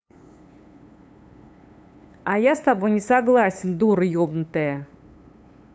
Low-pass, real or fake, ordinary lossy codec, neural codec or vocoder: none; fake; none; codec, 16 kHz, 8 kbps, FunCodec, trained on LibriTTS, 25 frames a second